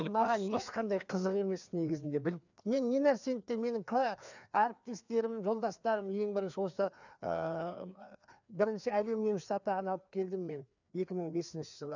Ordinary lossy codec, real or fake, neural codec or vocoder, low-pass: none; fake; codec, 16 kHz, 2 kbps, FreqCodec, larger model; 7.2 kHz